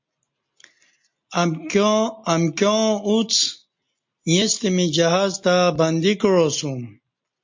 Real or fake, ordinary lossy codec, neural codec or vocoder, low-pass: real; MP3, 48 kbps; none; 7.2 kHz